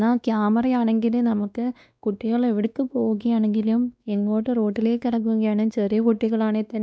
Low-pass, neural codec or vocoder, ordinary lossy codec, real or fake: none; codec, 16 kHz, 2 kbps, X-Codec, WavLM features, trained on Multilingual LibriSpeech; none; fake